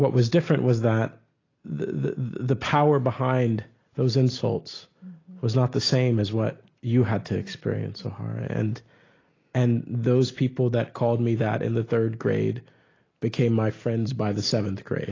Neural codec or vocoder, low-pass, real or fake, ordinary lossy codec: none; 7.2 kHz; real; AAC, 32 kbps